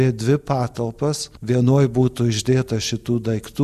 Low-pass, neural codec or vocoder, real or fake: 14.4 kHz; none; real